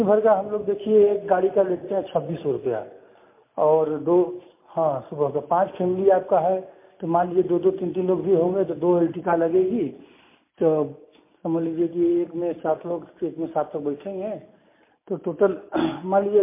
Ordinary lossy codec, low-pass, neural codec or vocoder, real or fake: MP3, 24 kbps; 3.6 kHz; none; real